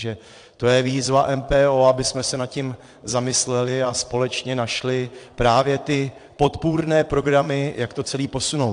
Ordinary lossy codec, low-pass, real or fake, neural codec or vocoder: AAC, 64 kbps; 9.9 kHz; fake; vocoder, 22.05 kHz, 80 mel bands, Vocos